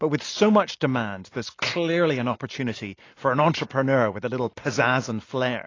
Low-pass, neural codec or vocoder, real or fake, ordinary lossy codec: 7.2 kHz; none; real; AAC, 32 kbps